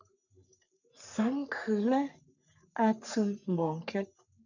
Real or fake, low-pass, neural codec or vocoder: fake; 7.2 kHz; codec, 44.1 kHz, 3.4 kbps, Pupu-Codec